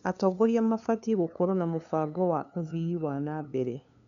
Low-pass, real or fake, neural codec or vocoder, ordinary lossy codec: 7.2 kHz; fake; codec, 16 kHz, 2 kbps, FunCodec, trained on LibriTTS, 25 frames a second; none